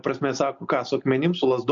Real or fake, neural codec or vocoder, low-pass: real; none; 7.2 kHz